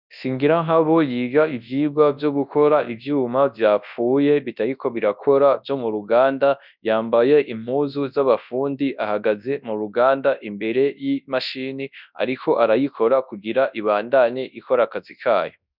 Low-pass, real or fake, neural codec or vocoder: 5.4 kHz; fake; codec, 24 kHz, 0.9 kbps, WavTokenizer, large speech release